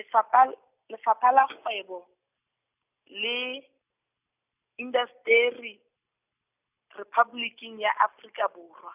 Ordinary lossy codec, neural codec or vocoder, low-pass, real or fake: none; none; 3.6 kHz; real